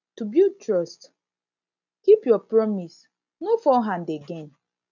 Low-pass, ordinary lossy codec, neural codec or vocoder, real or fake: 7.2 kHz; none; none; real